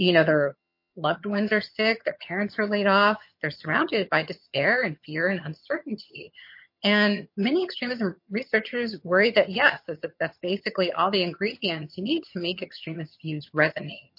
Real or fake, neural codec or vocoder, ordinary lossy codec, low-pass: fake; vocoder, 22.05 kHz, 80 mel bands, HiFi-GAN; MP3, 32 kbps; 5.4 kHz